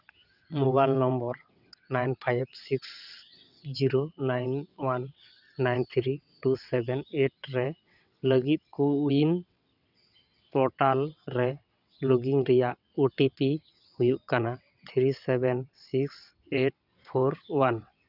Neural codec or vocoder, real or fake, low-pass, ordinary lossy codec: vocoder, 22.05 kHz, 80 mel bands, WaveNeXt; fake; 5.4 kHz; none